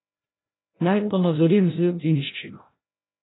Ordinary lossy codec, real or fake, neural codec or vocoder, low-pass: AAC, 16 kbps; fake; codec, 16 kHz, 0.5 kbps, FreqCodec, larger model; 7.2 kHz